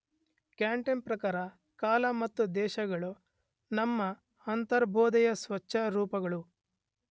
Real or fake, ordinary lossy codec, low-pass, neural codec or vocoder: real; none; none; none